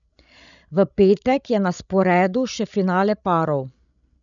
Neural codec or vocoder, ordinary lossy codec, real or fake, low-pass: codec, 16 kHz, 16 kbps, FreqCodec, larger model; none; fake; 7.2 kHz